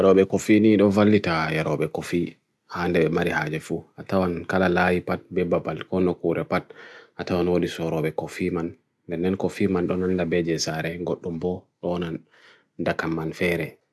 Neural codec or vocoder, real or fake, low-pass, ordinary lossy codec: none; real; none; none